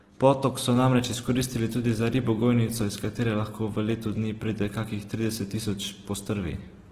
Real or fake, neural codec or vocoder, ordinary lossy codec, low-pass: real; none; Opus, 16 kbps; 14.4 kHz